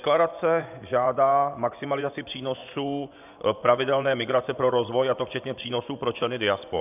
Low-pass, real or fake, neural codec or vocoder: 3.6 kHz; fake; vocoder, 24 kHz, 100 mel bands, Vocos